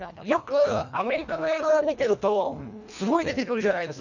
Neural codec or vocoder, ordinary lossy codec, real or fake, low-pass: codec, 24 kHz, 1.5 kbps, HILCodec; none; fake; 7.2 kHz